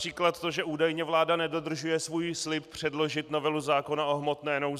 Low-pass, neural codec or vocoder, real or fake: 14.4 kHz; none; real